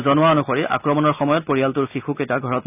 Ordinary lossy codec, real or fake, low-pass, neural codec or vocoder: none; real; 3.6 kHz; none